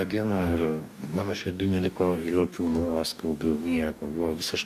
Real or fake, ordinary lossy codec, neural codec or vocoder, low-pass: fake; MP3, 96 kbps; codec, 44.1 kHz, 2.6 kbps, DAC; 14.4 kHz